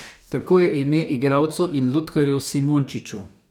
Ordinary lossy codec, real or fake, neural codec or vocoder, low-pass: none; fake; codec, 44.1 kHz, 2.6 kbps, DAC; 19.8 kHz